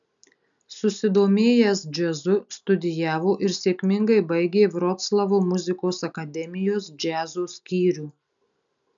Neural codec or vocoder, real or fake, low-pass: none; real; 7.2 kHz